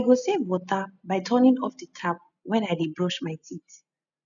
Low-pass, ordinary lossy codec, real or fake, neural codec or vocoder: 7.2 kHz; none; real; none